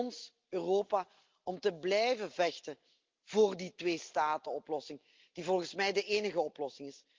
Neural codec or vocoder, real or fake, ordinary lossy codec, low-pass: none; real; Opus, 32 kbps; 7.2 kHz